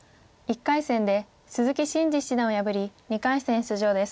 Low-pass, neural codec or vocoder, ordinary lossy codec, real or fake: none; none; none; real